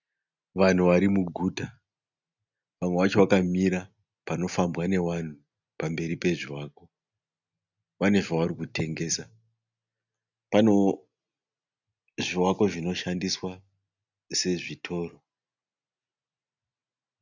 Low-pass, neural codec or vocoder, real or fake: 7.2 kHz; none; real